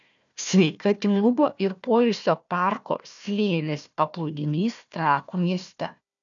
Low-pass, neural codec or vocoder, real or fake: 7.2 kHz; codec, 16 kHz, 1 kbps, FunCodec, trained on Chinese and English, 50 frames a second; fake